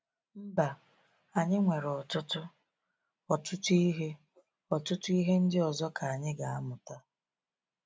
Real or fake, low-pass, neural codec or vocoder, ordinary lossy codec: real; none; none; none